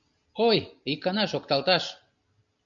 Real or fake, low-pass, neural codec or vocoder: real; 7.2 kHz; none